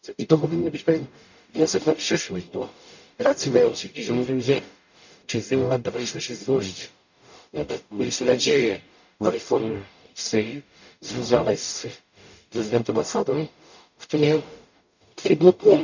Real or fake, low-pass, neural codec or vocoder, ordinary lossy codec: fake; 7.2 kHz; codec, 44.1 kHz, 0.9 kbps, DAC; none